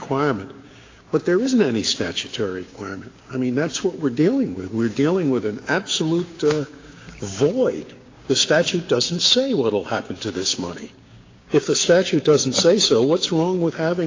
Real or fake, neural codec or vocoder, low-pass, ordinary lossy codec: fake; codec, 16 kHz, 6 kbps, DAC; 7.2 kHz; AAC, 32 kbps